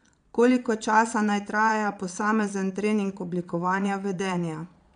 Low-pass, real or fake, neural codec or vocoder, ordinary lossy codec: 9.9 kHz; fake; vocoder, 22.05 kHz, 80 mel bands, Vocos; none